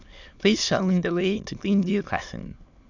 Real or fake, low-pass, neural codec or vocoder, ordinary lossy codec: fake; 7.2 kHz; autoencoder, 22.05 kHz, a latent of 192 numbers a frame, VITS, trained on many speakers; none